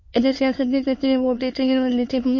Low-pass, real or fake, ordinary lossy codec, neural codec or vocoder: 7.2 kHz; fake; MP3, 32 kbps; autoencoder, 22.05 kHz, a latent of 192 numbers a frame, VITS, trained on many speakers